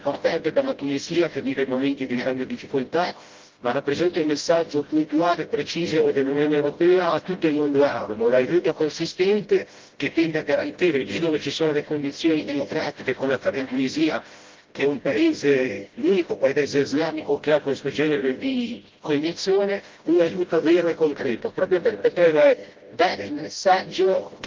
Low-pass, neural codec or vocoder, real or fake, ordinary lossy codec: 7.2 kHz; codec, 16 kHz, 0.5 kbps, FreqCodec, smaller model; fake; Opus, 32 kbps